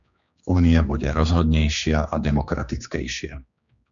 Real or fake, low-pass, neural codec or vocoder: fake; 7.2 kHz; codec, 16 kHz, 2 kbps, X-Codec, HuBERT features, trained on general audio